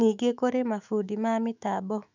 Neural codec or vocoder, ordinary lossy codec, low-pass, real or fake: autoencoder, 48 kHz, 128 numbers a frame, DAC-VAE, trained on Japanese speech; none; 7.2 kHz; fake